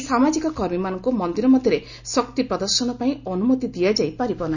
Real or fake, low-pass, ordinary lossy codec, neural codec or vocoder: real; 7.2 kHz; none; none